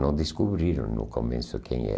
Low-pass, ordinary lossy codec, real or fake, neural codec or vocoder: none; none; real; none